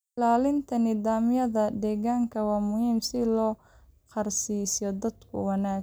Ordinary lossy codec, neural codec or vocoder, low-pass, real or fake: none; none; none; real